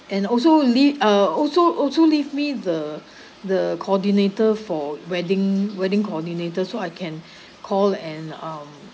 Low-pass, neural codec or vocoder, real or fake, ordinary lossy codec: none; none; real; none